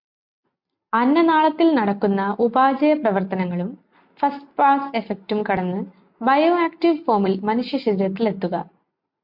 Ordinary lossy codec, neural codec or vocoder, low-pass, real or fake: MP3, 48 kbps; none; 5.4 kHz; real